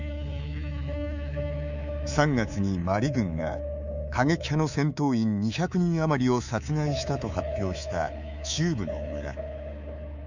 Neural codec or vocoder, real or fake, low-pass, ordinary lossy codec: codec, 24 kHz, 3.1 kbps, DualCodec; fake; 7.2 kHz; none